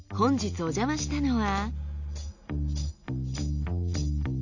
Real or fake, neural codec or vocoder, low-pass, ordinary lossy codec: real; none; 7.2 kHz; none